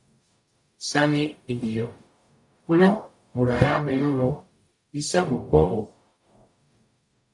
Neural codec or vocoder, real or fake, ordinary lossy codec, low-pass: codec, 44.1 kHz, 0.9 kbps, DAC; fake; AAC, 64 kbps; 10.8 kHz